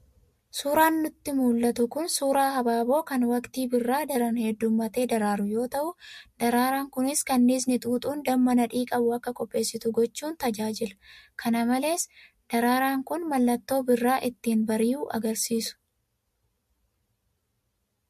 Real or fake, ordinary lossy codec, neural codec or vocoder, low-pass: real; MP3, 64 kbps; none; 14.4 kHz